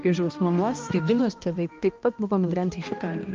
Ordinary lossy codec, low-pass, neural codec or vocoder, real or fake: Opus, 24 kbps; 7.2 kHz; codec, 16 kHz, 1 kbps, X-Codec, HuBERT features, trained on balanced general audio; fake